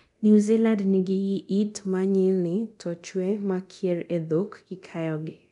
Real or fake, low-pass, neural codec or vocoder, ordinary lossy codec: fake; 10.8 kHz; codec, 24 kHz, 0.9 kbps, DualCodec; none